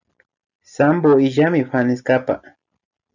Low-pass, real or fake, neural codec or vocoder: 7.2 kHz; real; none